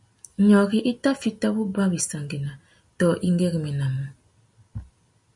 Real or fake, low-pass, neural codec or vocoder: real; 10.8 kHz; none